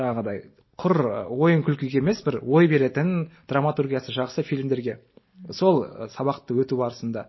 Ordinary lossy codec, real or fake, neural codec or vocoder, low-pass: MP3, 24 kbps; real; none; 7.2 kHz